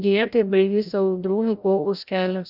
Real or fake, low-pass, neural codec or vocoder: fake; 5.4 kHz; codec, 16 kHz, 0.5 kbps, FreqCodec, larger model